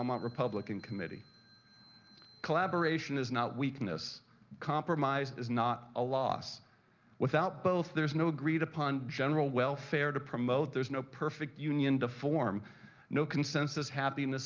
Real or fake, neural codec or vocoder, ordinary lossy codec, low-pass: real; none; Opus, 24 kbps; 7.2 kHz